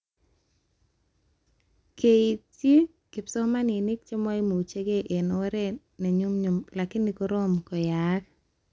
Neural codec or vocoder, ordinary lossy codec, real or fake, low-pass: none; none; real; none